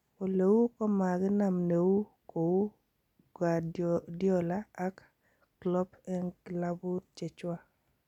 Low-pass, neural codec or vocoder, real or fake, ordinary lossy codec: 19.8 kHz; none; real; none